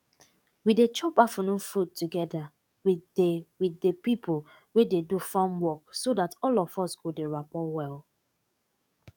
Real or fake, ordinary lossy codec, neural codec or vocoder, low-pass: fake; none; codec, 44.1 kHz, 7.8 kbps, DAC; 19.8 kHz